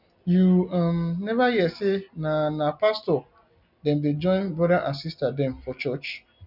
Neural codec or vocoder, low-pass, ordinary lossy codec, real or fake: none; 5.4 kHz; none; real